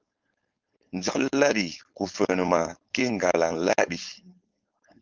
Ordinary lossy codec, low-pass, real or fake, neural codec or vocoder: Opus, 24 kbps; 7.2 kHz; fake; codec, 16 kHz, 4.8 kbps, FACodec